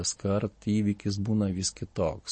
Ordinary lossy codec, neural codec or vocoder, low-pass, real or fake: MP3, 32 kbps; none; 9.9 kHz; real